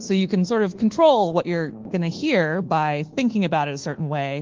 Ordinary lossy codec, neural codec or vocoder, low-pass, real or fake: Opus, 16 kbps; codec, 24 kHz, 1.2 kbps, DualCodec; 7.2 kHz; fake